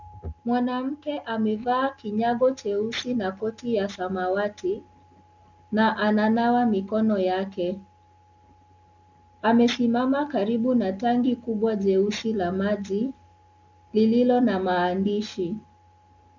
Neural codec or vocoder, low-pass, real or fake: none; 7.2 kHz; real